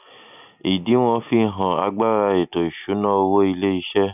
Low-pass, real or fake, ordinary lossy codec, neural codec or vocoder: 3.6 kHz; real; none; none